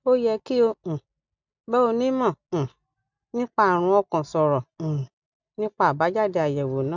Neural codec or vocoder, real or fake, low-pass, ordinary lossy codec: none; real; 7.2 kHz; none